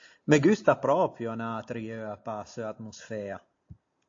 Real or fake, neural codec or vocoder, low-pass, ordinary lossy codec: real; none; 7.2 kHz; AAC, 48 kbps